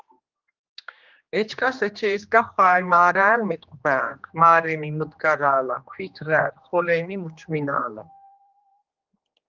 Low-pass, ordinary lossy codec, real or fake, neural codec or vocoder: 7.2 kHz; Opus, 32 kbps; fake; codec, 16 kHz, 2 kbps, X-Codec, HuBERT features, trained on general audio